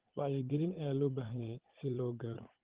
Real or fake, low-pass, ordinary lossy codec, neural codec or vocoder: fake; 3.6 kHz; Opus, 16 kbps; vocoder, 44.1 kHz, 80 mel bands, Vocos